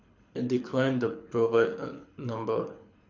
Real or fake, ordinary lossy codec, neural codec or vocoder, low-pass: fake; Opus, 64 kbps; codec, 24 kHz, 6 kbps, HILCodec; 7.2 kHz